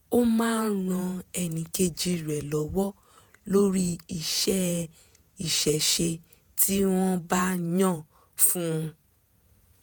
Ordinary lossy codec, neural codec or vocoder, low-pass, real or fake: none; vocoder, 48 kHz, 128 mel bands, Vocos; none; fake